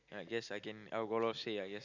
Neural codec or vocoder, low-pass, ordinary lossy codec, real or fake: none; 7.2 kHz; none; real